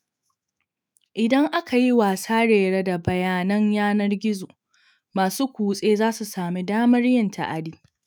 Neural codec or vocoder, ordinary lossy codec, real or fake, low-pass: autoencoder, 48 kHz, 128 numbers a frame, DAC-VAE, trained on Japanese speech; none; fake; none